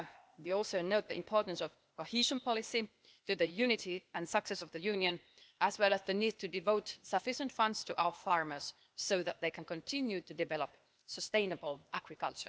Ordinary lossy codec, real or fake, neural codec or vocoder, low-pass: none; fake; codec, 16 kHz, 0.8 kbps, ZipCodec; none